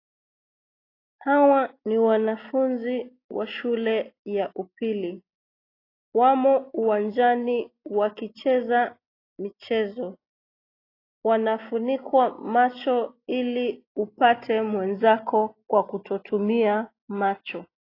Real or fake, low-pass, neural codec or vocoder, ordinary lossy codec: real; 5.4 kHz; none; AAC, 32 kbps